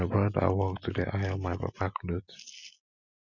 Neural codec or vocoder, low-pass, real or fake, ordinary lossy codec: none; 7.2 kHz; real; none